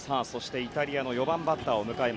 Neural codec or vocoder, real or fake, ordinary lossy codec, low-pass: none; real; none; none